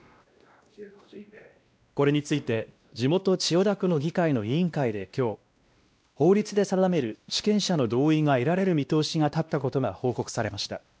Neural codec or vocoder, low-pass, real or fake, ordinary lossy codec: codec, 16 kHz, 1 kbps, X-Codec, WavLM features, trained on Multilingual LibriSpeech; none; fake; none